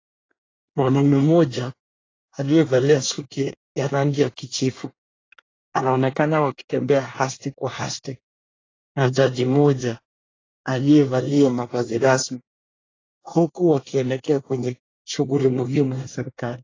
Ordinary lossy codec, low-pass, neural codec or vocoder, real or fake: AAC, 32 kbps; 7.2 kHz; codec, 24 kHz, 1 kbps, SNAC; fake